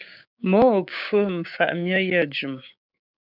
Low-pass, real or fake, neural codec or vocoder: 5.4 kHz; fake; codec, 16 kHz, 6 kbps, DAC